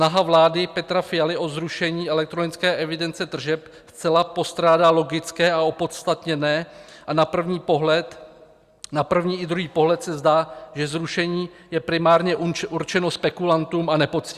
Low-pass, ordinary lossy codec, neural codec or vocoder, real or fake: 14.4 kHz; Opus, 64 kbps; none; real